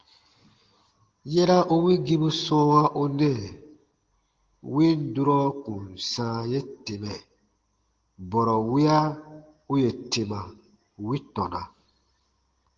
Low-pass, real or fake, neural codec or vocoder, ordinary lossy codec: 7.2 kHz; real; none; Opus, 16 kbps